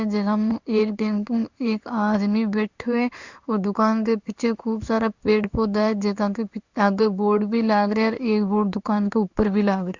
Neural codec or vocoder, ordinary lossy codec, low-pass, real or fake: codec, 16 kHz in and 24 kHz out, 1 kbps, XY-Tokenizer; Opus, 64 kbps; 7.2 kHz; fake